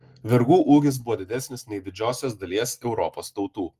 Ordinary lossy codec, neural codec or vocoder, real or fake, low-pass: Opus, 24 kbps; none; real; 14.4 kHz